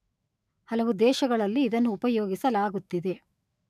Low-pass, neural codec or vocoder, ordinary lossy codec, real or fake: 14.4 kHz; autoencoder, 48 kHz, 128 numbers a frame, DAC-VAE, trained on Japanese speech; none; fake